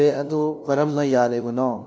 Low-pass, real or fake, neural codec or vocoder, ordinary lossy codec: none; fake; codec, 16 kHz, 0.5 kbps, FunCodec, trained on LibriTTS, 25 frames a second; none